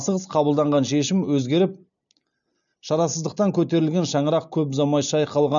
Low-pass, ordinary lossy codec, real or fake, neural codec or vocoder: 7.2 kHz; none; real; none